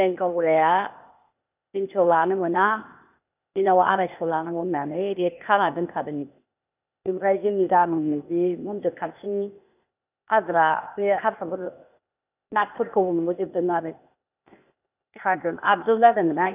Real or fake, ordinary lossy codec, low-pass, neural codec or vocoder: fake; none; 3.6 kHz; codec, 16 kHz, 0.8 kbps, ZipCodec